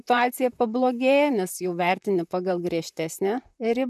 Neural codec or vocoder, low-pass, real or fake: vocoder, 44.1 kHz, 128 mel bands, Pupu-Vocoder; 14.4 kHz; fake